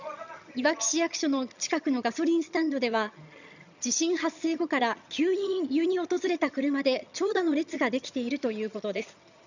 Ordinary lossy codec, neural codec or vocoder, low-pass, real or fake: none; vocoder, 22.05 kHz, 80 mel bands, HiFi-GAN; 7.2 kHz; fake